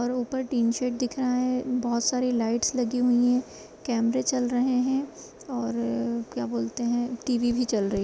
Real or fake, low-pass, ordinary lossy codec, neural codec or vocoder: real; none; none; none